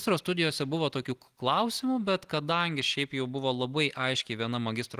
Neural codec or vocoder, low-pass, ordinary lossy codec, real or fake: none; 14.4 kHz; Opus, 24 kbps; real